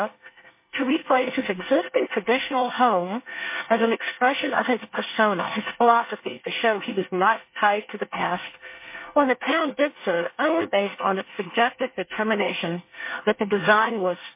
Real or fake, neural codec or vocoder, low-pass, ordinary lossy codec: fake; codec, 24 kHz, 1 kbps, SNAC; 3.6 kHz; MP3, 24 kbps